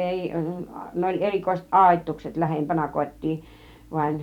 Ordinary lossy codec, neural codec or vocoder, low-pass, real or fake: none; vocoder, 44.1 kHz, 128 mel bands every 256 samples, BigVGAN v2; 19.8 kHz; fake